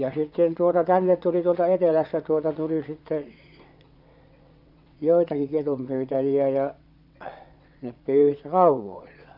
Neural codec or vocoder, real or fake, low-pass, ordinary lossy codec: vocoder, 22.05 kHz, 80 mel bands, WaveNeXt; fake; 5.4 kHz; none